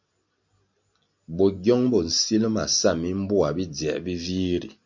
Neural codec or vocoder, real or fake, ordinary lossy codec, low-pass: none; real; MP3, 48 kbps; 7.2 kHz